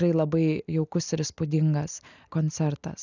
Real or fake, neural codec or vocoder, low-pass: real; none; 7.2 kHz